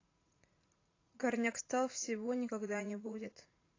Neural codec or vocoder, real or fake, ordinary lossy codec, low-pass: vocoder, 44.1 kHz, 80 mel bands, Vocos; fake; AAC, 32 kbps; 7.2 kHz